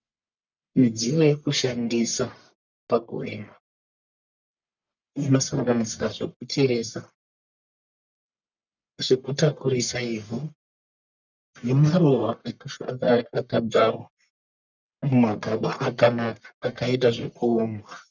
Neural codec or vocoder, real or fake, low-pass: codec, 44.1 kHz, 1.7 kbps, Pupu-Codec; fake; 7.2 kHz